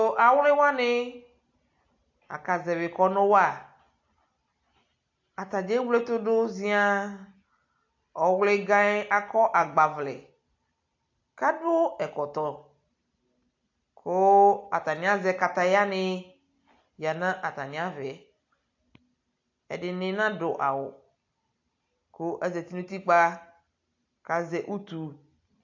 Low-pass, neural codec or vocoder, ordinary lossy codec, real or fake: 7.2 kHz; none; AAC, 48 kbps; real